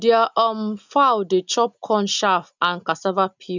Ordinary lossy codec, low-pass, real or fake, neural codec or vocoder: none; 7.2 kHz; real; none